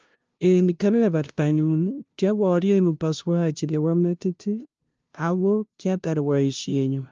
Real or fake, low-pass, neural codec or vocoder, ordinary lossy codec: fake; 7.2 kHz; codec, 16 kHz, 0.5 kbps, FunCodec, trained on LibriTTS, 25 frames a second; Opus, 24 kbps